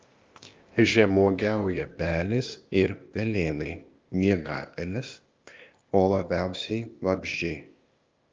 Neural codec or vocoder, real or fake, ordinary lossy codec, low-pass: codec, 16 kHz, 0.8 kbps, ZipCodec; fake; Opus, 24 kbps; 7.2 kHz